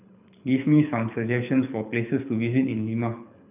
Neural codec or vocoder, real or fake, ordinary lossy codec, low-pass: codec, 24 kHz, 6 kbps, HILCodec; fake; none; 3.6 kHz